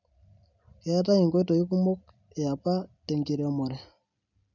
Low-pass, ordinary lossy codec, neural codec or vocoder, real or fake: 7.2 kHz; none; none; real